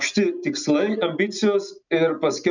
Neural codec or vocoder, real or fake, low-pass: none; real; 7.2 kHz